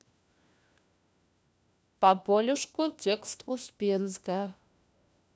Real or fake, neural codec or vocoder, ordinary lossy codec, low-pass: fake; codec, 16 kHz, 1 kbps, FunCodec, trained on LibriTTS, 50 frames a second; none; none